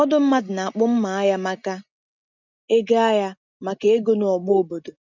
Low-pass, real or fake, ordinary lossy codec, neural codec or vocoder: 7.2 kHz; real; none; none